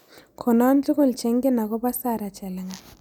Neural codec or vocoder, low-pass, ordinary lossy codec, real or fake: none; none; none; real